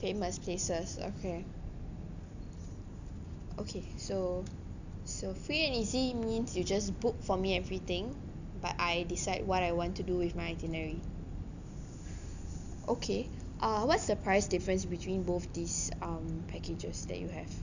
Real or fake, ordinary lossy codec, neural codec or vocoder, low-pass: real; none; none; 7.2 kHz